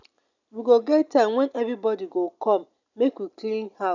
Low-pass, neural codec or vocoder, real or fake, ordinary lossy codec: 7.2 kHz; none; real; none